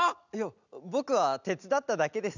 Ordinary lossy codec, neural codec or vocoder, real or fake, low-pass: none; none; real; 7.2 kHz